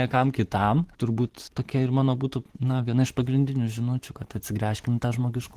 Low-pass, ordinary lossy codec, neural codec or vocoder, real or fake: 14.4 kHz; Opus, 16 kbps; vocoder, 44.1 kHz, 128 mel bands every 512 samples, BigVGAN v2; fake